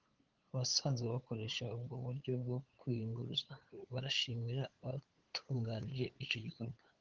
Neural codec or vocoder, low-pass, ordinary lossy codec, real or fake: codec, 16 kHz, 8 kbps, FunCodec, trained on LibriTTS, 25 frames a second; 7.2 kHz; Opus, 16 kbps; fake